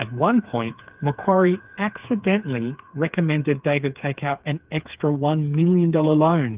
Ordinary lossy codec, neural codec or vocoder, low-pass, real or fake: Opus, 24 kbps; codec, 16 kHz, 4 kbps, FreqCodec, smaller model; 3.6 kHz; fake